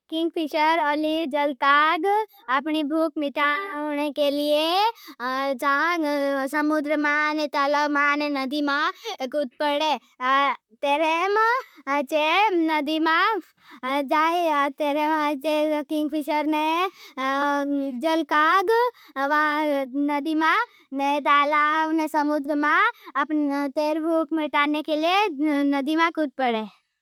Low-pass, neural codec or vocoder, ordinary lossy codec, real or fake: 19.8 kHz; vocoder, 44.1 kHz, 128 mel bands, Pupu-Vocoder; MP3, 96 kbps; fake